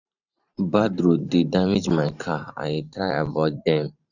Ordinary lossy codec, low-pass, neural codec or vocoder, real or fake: none; 7.2 kHz; vocoder, 44.1 kHz, 128 mel bands every 512 samples, BigVGAN v2; fake